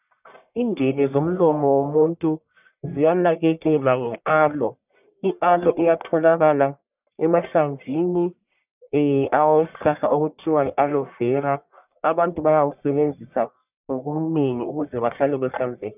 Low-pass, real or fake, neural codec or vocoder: 3.6 kHz; fake; codec, 44.1 kHz, 1.7 kbps, Pupu-Codec